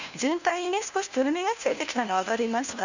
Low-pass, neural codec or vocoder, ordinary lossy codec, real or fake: 7.2 kHz; codec, 16 kHz, 1 kbps, FunCodec, trained on LibriTTS, 50 frames a second; none; fake